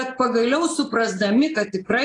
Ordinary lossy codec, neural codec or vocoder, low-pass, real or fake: AAC, 32 kbps; none; 10.8 kHz; real